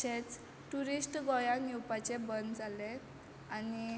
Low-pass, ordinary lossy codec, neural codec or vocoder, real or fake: none; none; none; real